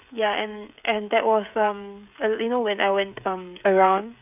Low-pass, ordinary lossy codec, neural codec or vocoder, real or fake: 3.6 kHz; none; codec, 16 kHz, 16 kbps, FreqCodec, smaller model; fake